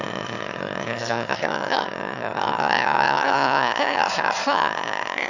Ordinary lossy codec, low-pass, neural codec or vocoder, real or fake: none; 7.2 kHz; autoencoder, 22.05 kHz, a latent of 192 numbers a frame, VITS, trained on one speaker; fake